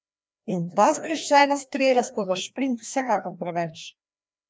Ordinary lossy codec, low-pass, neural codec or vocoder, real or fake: none; none; codec, 16 kHz, 1 kbps, FreqCodec, larger model; fake